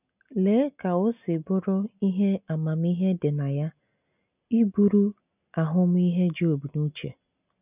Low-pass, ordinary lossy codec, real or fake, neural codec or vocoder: 3.6 kHz; none; real; none